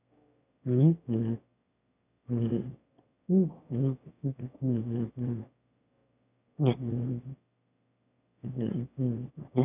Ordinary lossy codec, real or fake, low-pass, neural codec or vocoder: none; fake; 3.6 kHz; autoencoder, 22.05 kHz, a latent of 192 numbers a frame, VITS, trained on one speaker